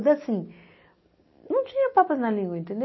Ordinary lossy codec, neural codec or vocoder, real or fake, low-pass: MP3, 24 kbps; none; real; 7.2 kHz